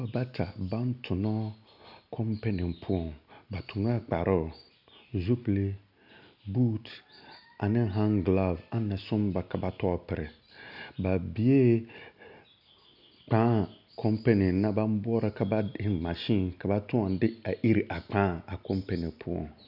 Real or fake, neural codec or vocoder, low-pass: real; none; 5.4 kHz